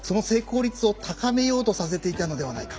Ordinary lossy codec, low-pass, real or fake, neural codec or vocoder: none; none; real; none